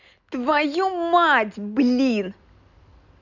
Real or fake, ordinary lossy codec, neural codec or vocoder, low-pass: real; none; none; 7.2 kHz